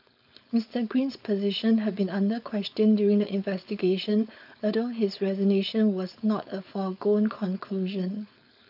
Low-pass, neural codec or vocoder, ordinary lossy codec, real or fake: 5.4 kHz; codec, 16 kHz, 4.8 kbps, FACodec; AAC, 48 kbps; fake